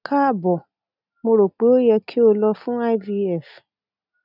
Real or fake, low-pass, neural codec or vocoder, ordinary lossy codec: real; 5.4 kHz; none; none